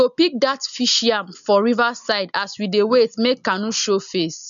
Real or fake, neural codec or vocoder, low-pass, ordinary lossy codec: real; none; 7.2 kHz; none